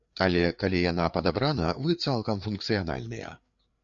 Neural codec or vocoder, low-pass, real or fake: codec, 16 kHz, 4 kbps, FreqCodec, larger model; 7.2 kHz; fake